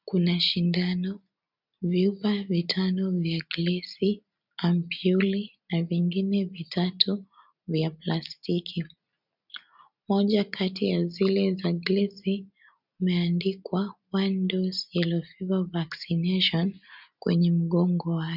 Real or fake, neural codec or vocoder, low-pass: real; none; 5.4 kHz